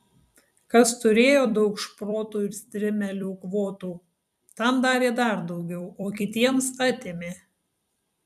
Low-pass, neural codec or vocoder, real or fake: 14.4 kHz; vocoder, 44.1 kHz, 128 mel bands every 256 samples, BigVGAN v2; fake